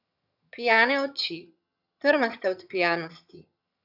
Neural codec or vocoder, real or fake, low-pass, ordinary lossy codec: vocoder, 22.05 kHz, 80 mel bands, HiFi-GAN; fake; 5.4 kHz; none